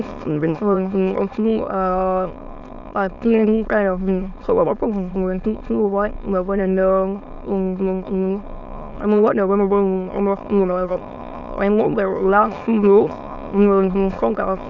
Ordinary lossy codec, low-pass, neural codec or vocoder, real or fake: none; 7.2 kHz; autoencoder, 22.05 kHz, a latent of 192 numbers a frame, VITS, trained on many speakers; fake